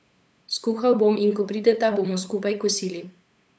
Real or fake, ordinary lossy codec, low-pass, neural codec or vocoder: fake; none; none; codec, 16 kHz, 8 kbps, FunCodec, trained on LibriTTS, 25 frames a second